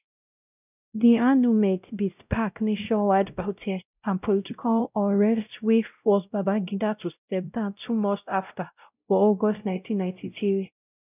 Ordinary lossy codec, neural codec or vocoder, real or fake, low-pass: none; codec, 16 kHz, 0.5 kbps, X-Codec, WavLM features, trained on Multilingual LibriSpeech; fake; 3.6 kHz